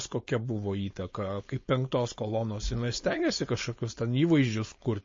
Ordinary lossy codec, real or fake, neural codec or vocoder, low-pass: MP3, 32 kbps; fake; codec, 16 kHz, 4.8 kbps, FACodec; 7.2 kHz